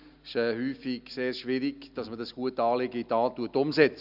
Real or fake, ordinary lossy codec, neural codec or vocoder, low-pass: real; none; none; 5.4 kHz